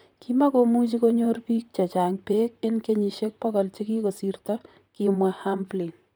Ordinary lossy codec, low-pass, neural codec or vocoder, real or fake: none; none; vocoder, 44.1 kHz, 128 mel bands, Pupu-Vocoder; fake